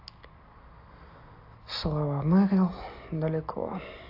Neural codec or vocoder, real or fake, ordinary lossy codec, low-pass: none; real; AAC, 32 kbps; 5.4 kHz